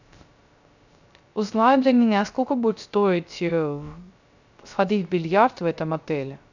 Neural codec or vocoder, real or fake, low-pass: codec, 16 kHz, 0.3 kbps, FocalCodec; fake; 7.2 kHz